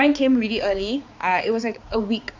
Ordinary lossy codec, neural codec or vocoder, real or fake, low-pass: AAC, 48 kbps; codec, 16 kHz, 4 kbps, X-Codec, HuBERT features, trained on balanced general audio; fake; 7.2 kHz